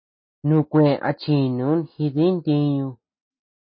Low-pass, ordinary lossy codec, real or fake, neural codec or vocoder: 7.2 kHz; MP3, 24 kbps; real; none